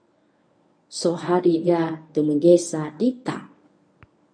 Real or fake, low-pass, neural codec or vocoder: fake; 9.9 kHz; codec, 24 kHz, 0.9 kbps, WavTokenizer, medium speech release version 1